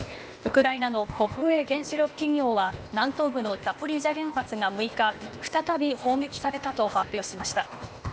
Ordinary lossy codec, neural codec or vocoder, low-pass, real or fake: none; codec, 16 kHz, 0.8 kbps, ZipCodec; none; fake